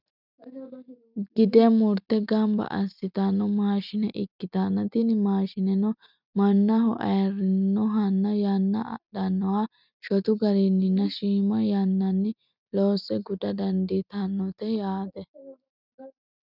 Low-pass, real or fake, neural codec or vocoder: 5.4 kHz; real; none